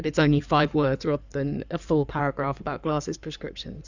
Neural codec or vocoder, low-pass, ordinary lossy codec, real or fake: codec, 16 kHz in and 24 kHz out, 2.2 kbps, FireRedTTS-2 codec; 7.2 kHz; Opus, 64 kbps; fake